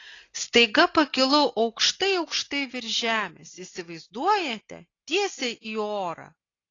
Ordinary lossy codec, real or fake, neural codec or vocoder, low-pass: AAC, 32 kbps; real; none; 7.2 kHz